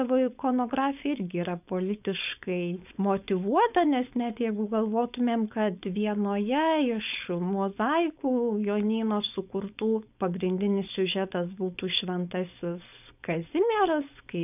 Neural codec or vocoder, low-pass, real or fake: codec, 16 kHz, 4.8 kbps, FACodec; 3.6 kHz; fake